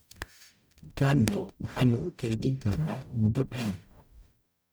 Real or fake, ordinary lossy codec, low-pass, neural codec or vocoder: fake; none; none; codec, 44.1 kHz, 0.9 kbps, DAC